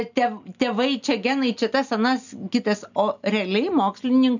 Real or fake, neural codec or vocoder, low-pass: real; none; 7.2 kHz